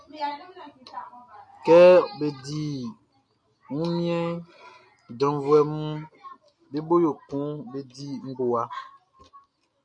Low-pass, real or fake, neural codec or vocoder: 9.9 kHz; real; none